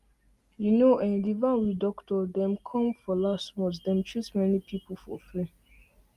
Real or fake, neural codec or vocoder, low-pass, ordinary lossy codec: real; none; 14.4 kHz; Opus, 32 kbps